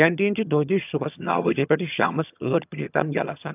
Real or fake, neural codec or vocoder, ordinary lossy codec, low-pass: fake; vocoder, 22.05 kHz, 80 mel bands, HiFi-GAN; none; 3.6 kHz